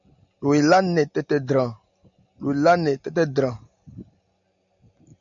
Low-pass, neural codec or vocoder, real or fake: 7.2 kHz; none; real